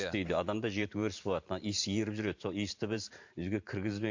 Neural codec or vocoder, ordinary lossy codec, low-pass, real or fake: vocoder, 44.1 kHz, 128 mel bands every 512 samples, BigVGAN v2; MP3, 48 kbps; 7.2 kHz; fake